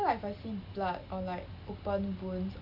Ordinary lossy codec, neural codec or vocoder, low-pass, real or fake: none; none; 5.4 kHz; real